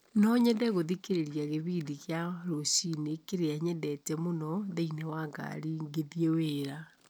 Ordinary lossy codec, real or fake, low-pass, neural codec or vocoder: none; real; none; none